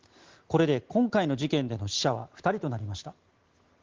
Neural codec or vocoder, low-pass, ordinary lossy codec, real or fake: none; 7.2 kHz; Opus, 16 kbps; real